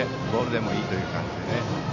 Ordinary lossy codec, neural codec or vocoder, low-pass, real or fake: none; vocoder, 44.1 kHz, 128 mel bands every 256 samples, BigVGAN v2; 7.2 kHz; fake